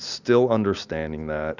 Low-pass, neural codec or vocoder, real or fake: 7.2 kHz; none; real